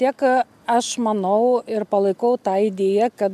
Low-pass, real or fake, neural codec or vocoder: 14.4 kHz; real; none